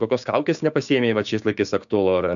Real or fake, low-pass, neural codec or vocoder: fake; 7.2 kHz; codec, 16 kHz, 6 kbps, DAC